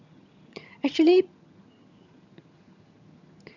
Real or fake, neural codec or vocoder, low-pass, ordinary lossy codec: fake; vocoder, 22.05 kHz, 80 mel bands, HiFi-GAN; 7.2 kHz; none